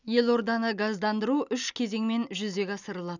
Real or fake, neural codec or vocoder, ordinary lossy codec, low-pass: real; none; none; 7.2 kHz